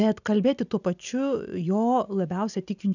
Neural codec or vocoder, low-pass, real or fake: none; 7.2 kHz; real